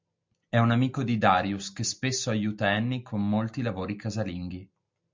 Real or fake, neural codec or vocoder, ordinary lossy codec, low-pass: real; none; MP3, 64 kbps; 7.2 kHz